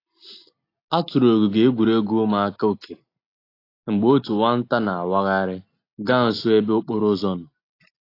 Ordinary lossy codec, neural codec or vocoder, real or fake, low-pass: AAC, 32 kbps; none; real; 5.4 kHz